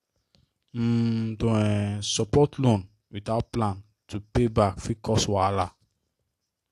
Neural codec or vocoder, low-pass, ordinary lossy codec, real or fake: none; 14.4 kHz; AAC, 64 kbps; real